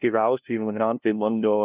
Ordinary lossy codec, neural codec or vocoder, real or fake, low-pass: Opus, 24 kbps; codec, 16 kHz, 0.5 kbps, FunCodec, trained on LibriTTS, 25 frames a second; fake; 3.6 kHz